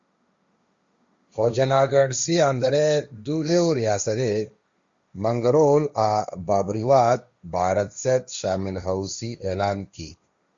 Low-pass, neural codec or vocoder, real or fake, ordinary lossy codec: 7.2 kHz; codec, 16 kHz, 1.1 kbps, Voila-Tokenizer; fake; Opus, 64 kbps